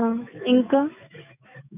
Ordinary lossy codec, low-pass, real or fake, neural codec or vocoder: none; 3.6 kHz; fake; autoencoder, 48 kHz, 128 numbers a frame, DAC-VAE, trained on Japanese speech